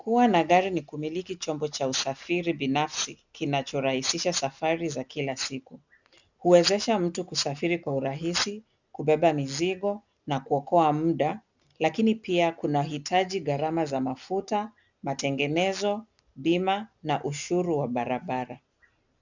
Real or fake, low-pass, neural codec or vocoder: real; 7.2 kHz; none